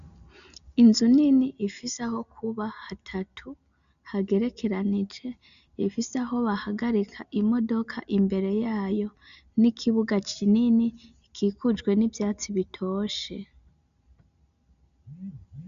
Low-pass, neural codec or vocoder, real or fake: 7.2 kHz; none; real